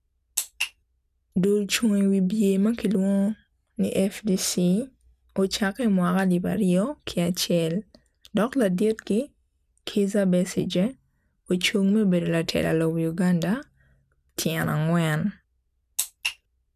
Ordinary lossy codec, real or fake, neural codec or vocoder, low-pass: none; real; none; 14.4 kHz